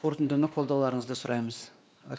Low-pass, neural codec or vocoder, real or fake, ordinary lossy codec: none; codec, 16 kHz, 2 kbps, X-Codec, WavLM features, trained on Multilingual LibriSpeech; fake; none